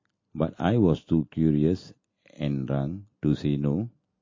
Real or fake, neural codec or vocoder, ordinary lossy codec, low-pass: real; none; MP3, 32 kbps; 7.2 kHz